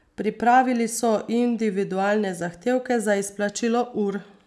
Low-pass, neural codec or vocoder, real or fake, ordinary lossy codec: none; none; real; none